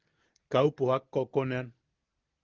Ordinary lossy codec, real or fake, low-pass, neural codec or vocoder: Opus, 32 kbps; real; 7.2 kHz; none